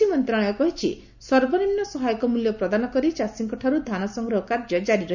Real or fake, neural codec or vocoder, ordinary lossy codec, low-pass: real; none; none; 7.2 kHz